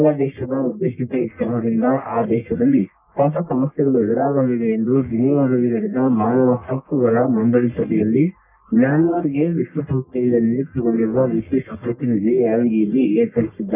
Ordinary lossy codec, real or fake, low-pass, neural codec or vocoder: none; fake; 3.6 kHz; codec, 44.1 kHz, 1.7 kbps, Pupu-Codec